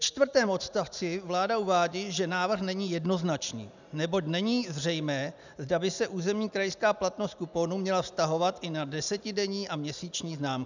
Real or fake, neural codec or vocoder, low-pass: real; none; 7.2 kHz